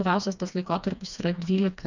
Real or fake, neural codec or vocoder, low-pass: fake; codec, 16 kHz, 2 kbps, FreqCodec, smaller model; 7.2 kHz